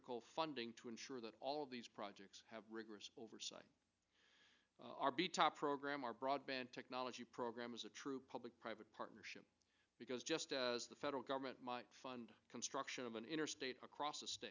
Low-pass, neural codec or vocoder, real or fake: 7.2 kHz; none; real